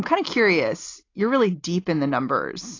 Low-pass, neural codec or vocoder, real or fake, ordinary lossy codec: 7.2 kHz; none; real; AAC, 32 kbps